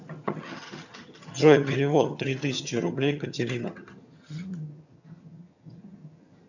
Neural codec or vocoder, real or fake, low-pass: vocoder, 22.05 kHz, 80 mel bands, HiFi-GAN; fake; 7.2 kHz